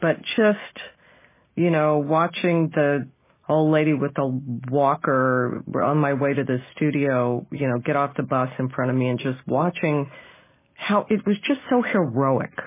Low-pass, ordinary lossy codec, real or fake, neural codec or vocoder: 3.6 kHz; MP3, 16 kbps; real; none